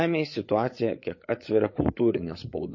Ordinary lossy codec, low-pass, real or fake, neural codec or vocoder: MP3, 32 kbps; 7.2 kHz; fake; codec, 16 kHz, 8 kbps, FreqCodec, larger model